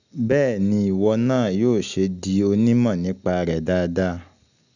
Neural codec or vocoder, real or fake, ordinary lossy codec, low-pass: none; real; none; 7.2 kHz